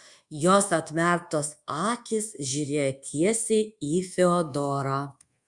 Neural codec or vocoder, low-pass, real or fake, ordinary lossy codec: codec, 24 kHz, 1.2 kbps, DualCodec; 10.8 kHz; fake; Opus, 64 kbps